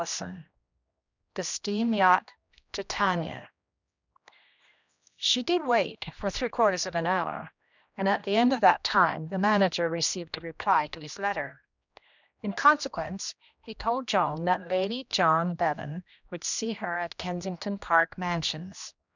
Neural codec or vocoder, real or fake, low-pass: codec, 16 kHz, 1 kbps, X-Codec, HuBERT features, trained on general audio; fake; 7.2 kHz